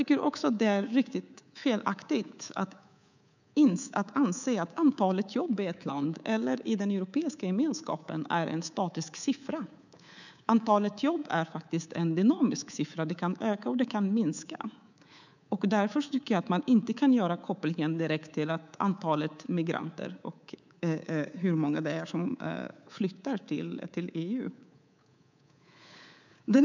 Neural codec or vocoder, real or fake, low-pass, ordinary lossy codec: codec, 24 kHz, 3.1 kbps, DualCodec; fake; 7.2 kHz; none